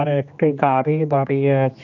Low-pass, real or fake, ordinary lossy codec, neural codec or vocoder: 7.2 kHz; fake; none; codec, 16 kHz, 2 kbps, X-Codec, HuBERT features, trained on general audio